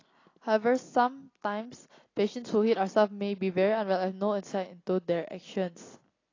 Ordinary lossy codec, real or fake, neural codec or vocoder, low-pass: AAC, 32 kbps; real; none; 7.2 kHz